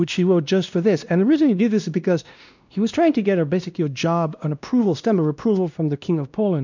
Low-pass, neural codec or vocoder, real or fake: 7.2 kHz; codec, 16 kHz, 1 kbps, X-Codec, WavLM features, trained on Multilingual LibriSpeech; fake